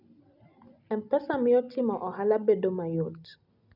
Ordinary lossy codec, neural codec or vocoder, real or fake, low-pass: none; none; real; 5.4 kHz